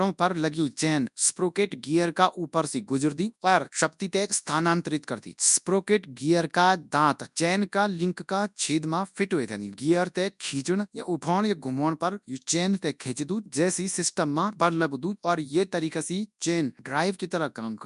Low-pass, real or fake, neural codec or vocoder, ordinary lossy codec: 10.8 kHz; fake; codec, 24 kHz, 0.9 kbps, WavTokenizer, large speech release; none